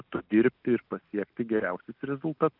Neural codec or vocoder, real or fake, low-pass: none; real; 5.4 kHz